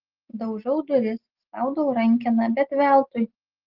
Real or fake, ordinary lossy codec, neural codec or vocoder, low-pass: real; Opus, 16 kbps; none; 5.4 kHz